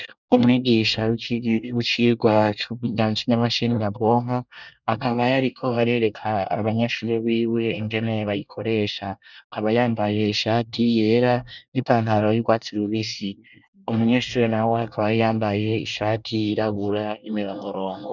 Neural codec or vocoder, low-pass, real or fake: codec, 24 kHz, 1 kbps, SNAC; 7.2 kHz; fake